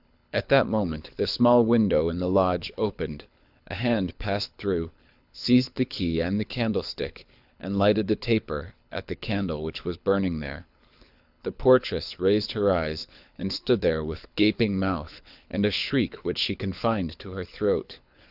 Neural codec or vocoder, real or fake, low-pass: codec, 24 kHz, 6 kbps, HILCodec; fake; 5.4 kHz